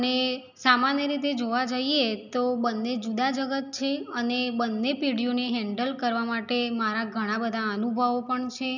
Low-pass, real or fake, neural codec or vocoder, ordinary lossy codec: 7.2 kHz; real; none; none